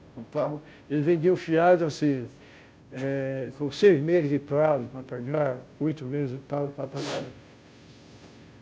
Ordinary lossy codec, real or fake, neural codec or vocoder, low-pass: none; fake; codec, 16 kHz, 0.5 kbps, FunCodec, trained on Chinese and English, 25 frames a second; none